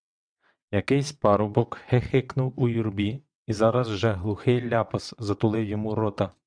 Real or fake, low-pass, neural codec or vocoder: fake; 9.9 kHz; vocoder, 22.05 kHz, 80 mel bands, WaveNeXt